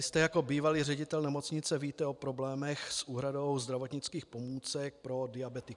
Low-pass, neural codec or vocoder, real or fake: 10.8 kHz; vocoder, 44.1 kHz, 128 mel bands every 512 samples, BigVGAN v2; fake